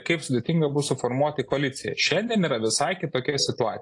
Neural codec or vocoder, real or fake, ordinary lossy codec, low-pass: none; real; AAC, 48 kbps; 10.8 kHz